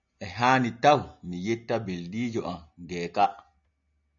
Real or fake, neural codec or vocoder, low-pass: real; none; 7.2 kHz